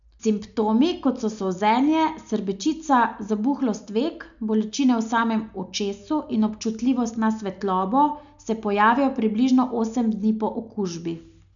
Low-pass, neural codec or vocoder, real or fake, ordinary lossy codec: 7.2 kHz; none; real; none